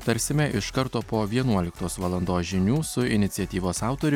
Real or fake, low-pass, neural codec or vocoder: real; 19.8 kHz; none